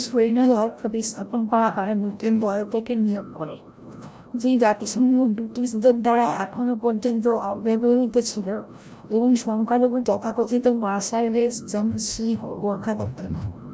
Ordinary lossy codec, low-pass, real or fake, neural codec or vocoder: none; none; fake; codec, 16 kHz, 0.5 kbps, FreqCodec, larger model